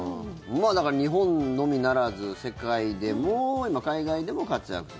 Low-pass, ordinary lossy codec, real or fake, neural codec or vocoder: none; none; real; none